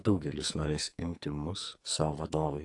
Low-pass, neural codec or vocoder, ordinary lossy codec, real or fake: 10.8 kHz; codec, 44.1 kHz, 2.6 kbps, SNAC; AAC, 48 kbps; fake